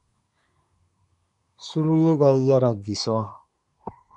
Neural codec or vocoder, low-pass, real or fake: codec, 24 kHz, 1 kbps, SNAC; 10.8 kHz; fake